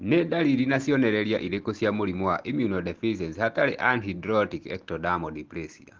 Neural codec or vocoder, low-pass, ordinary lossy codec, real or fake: none; 7.2 kHz; Opus, 16 kbps; real